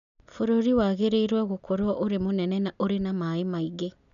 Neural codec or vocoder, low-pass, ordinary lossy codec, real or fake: none; 7.2 kHz; none; real